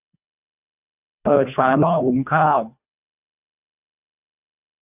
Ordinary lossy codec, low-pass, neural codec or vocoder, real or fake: none; 3.6 kHz; codec, 24 kHz, 1.5 kbps, HILCodec; fake